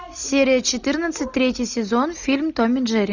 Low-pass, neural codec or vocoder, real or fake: 7.2 kHz; none; real